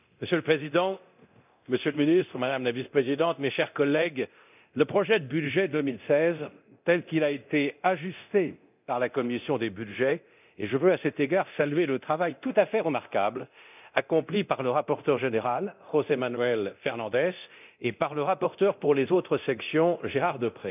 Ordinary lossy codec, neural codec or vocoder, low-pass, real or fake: none; codec, 24 kHz, 0.9 kbps, DualCodec; 3.6 kHz; fake